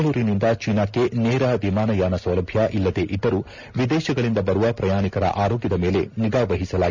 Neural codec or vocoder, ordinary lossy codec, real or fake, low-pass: none; none; real; 7.2 kHz